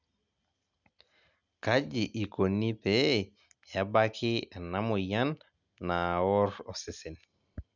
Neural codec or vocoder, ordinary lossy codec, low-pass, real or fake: none; none; 7.2 kHz; real